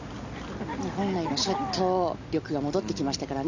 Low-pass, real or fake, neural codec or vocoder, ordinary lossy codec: 7.2 kHz; real; none; none